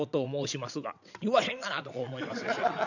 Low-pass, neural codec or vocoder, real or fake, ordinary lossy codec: 7.2 kHz; vocoder, 22.05 kHz, 80 mel bands, WaveNeXt; fake; none